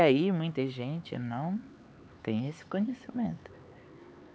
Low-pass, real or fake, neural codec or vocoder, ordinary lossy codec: none; fake; codec, 16 kHz, 4 kbps, X-Codec, HuBERT features, trained on LibriSpeech; none